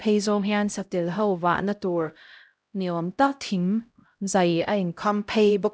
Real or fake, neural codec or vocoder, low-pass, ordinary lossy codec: fake; codec, 16 kHz, 0.5 kbps, X-Codec, HuBERT features, trained on LibriSpeech; none; none